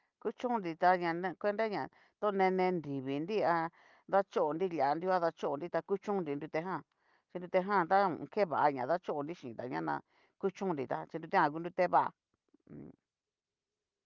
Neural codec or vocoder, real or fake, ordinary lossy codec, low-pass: none; real; Opus, 24 kbps; 7.2 kHz